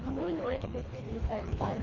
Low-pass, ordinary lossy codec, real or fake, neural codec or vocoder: 7.2 kHz; none; fake; codec, 24 kHz, 1.5 kbps, HILCodec